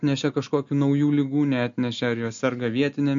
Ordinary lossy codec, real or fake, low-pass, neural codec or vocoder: MP3, 48 kbps; real; 7.2 kHz; none